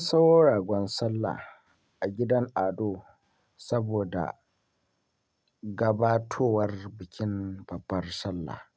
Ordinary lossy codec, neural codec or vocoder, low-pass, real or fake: none; none; none; real